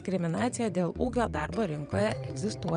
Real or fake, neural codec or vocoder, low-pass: fake; vocoder, 22.05 kHz, 80 mel bands, WaveNeXt; 9.9 kHz